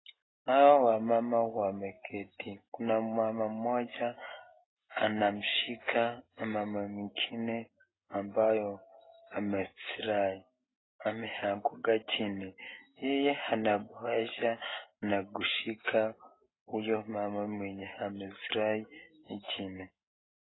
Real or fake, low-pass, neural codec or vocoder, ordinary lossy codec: real; 7.2 kHz; none; AAC, 16 kbps